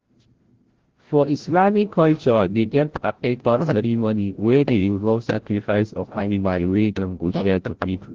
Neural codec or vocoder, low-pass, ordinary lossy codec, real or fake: codec, 16 kHz, 0.5 kbps, FreqCodec, larger model; 7.2 kHz; Opus, 16 kbps; fake